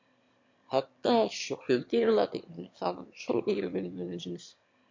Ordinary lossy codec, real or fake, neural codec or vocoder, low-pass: MP3, 48 kbps; fake; autoencoder, 22.05 kHz, a latent of 192 numbers a frame, VITS, trained on one speaker; 7.2 kHz